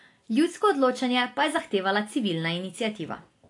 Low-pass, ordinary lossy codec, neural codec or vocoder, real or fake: 10.8 kHz; AAC, 48 kbps; none; real